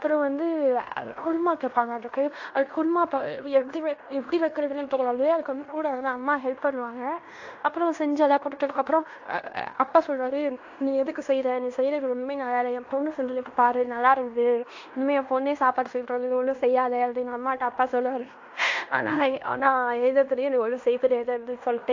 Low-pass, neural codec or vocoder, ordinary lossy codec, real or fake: 7.2 kHz; codec, 16 kHz in and 24 kHz out, 0.9 kbps, LongCat-Audio-Codec, fine tuned four codebook decoder; AAC, 48 kbps; fake